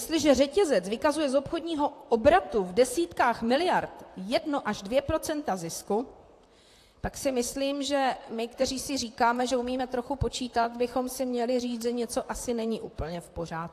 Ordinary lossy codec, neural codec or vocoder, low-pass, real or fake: AAC, 64 kbps; vocoder, 44.1 kHz, 128 mel bands, Pupu-Vocoder; 14.4 kHz; fake